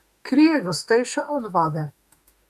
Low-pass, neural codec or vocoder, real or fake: 14.4 kHz; autoencoder, 48 kHz, 32 numbers a frame, DAC-VAE, trained on Japanese speech; fake